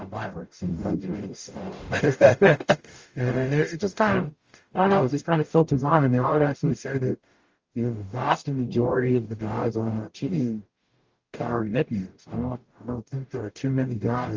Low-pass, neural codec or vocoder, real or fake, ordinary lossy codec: 7.2 kHz; codec, 44.1 kHz, 0.9 kbps, DAC; fake; Opus, 32 kbps